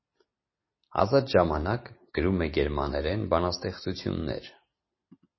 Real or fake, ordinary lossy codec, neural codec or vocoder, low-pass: real; MP3, 24 kbps; none; 7.2 kHz